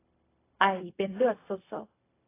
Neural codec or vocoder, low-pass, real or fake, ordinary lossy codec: codec, 16 kHz, 0.4 kbps, LongCat-Audio-Codec; 3.6 kHz; fake; AAC, 24 kbps